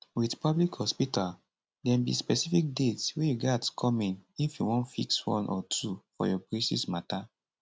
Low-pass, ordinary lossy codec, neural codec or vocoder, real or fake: none; none; none; real